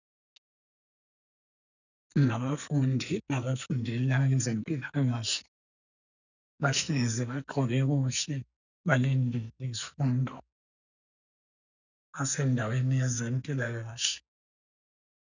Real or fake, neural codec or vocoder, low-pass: fake; codec, 44.1 kHz, 2.6 kbps, SNAC; 7.2 kHz